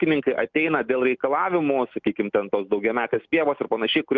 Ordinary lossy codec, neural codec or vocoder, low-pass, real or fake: Opus, 24 kbps; none; 7.2 kHz; real